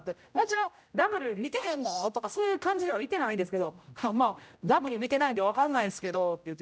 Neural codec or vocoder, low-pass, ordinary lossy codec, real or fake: codec, 16 kHz, 0.5 kbps, X-Codec, HuBERT features, trained on general audio; none; none; fake